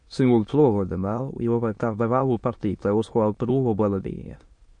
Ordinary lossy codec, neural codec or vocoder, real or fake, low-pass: MP3, 48 kbps; autoencoder, 22.05 kHz, a latent of 192 numbers a frame, VITS, trained on many speakers; fake; 9.9 kHz